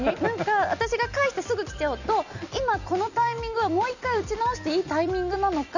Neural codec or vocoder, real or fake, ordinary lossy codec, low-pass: none; real; none; 7.2 kHz